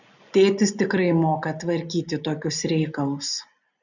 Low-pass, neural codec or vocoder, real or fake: 7.2 kHz; none; real